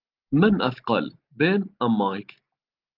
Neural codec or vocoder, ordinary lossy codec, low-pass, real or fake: none; Opus, 24 kbps; 5.4 kHz; real